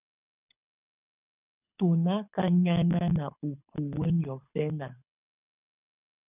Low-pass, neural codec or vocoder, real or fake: 3.6 kHz; codec, 24 kHz, 6 kbps, HILCodec; fake